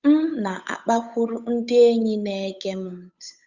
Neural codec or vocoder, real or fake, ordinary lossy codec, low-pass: codec, 16 kHz, 8 kbps, FunCodec, trained on Chinese and English, 25 frames a second; fake; Opus, 64 kbps; 7.2 kHz